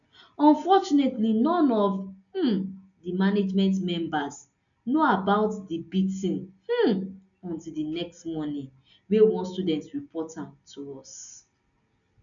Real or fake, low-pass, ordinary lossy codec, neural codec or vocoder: real; 7.2 kHz; none; none